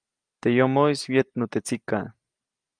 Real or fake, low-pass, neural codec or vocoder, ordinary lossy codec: real; 9.9 kHz; none; Opus, 32 kbps